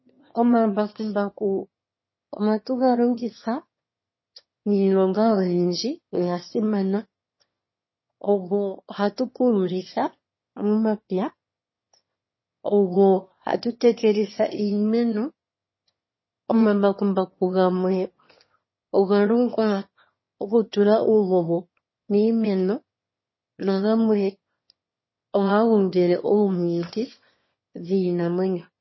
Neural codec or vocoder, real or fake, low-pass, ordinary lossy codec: autoencoder, 22.05 kHz, a latent of 192 numbers a frame, VITS, trained on one speaker; fake; 7.2 kHz; MP3, 24 kbps